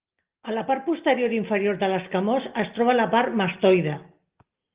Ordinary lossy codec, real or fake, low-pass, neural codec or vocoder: Opus, 32 kbps; real; 3.6 kHz; none